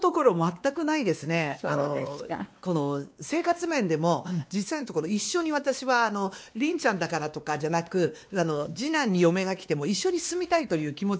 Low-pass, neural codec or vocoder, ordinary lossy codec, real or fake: none; codec, 16 kHz, 2 kbps, X-Codec, WavLM features, trained on Multilingual LibriSpeech; none; fake